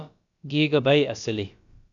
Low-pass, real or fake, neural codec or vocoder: 7.2 kHz; fake; codec, 16 kHz, about 1 kbps, DyCAST, with the encoder's durations